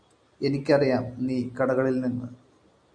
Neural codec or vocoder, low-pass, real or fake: none; 9.9 kHz; real